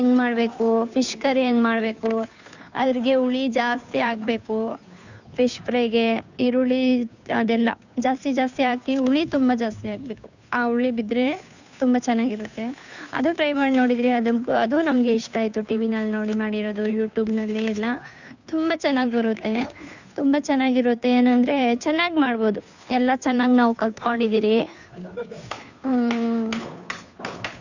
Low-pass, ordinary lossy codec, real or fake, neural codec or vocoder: 7.2 kHz; none; fake; codec, 16 kHz, 2 kbps, FunCodec, trained on Chinese and English, 25 frames a second